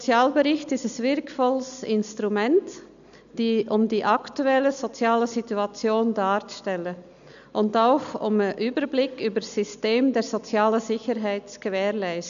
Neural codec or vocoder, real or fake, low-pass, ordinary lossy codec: none; real; 7.2 kHz; none